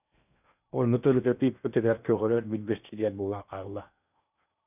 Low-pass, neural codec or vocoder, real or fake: 3.6 kHz; codec, 16 kHz in and 24 kHz out, 0.8 kbps, FocalCodec, streaming, 65536 codes; fake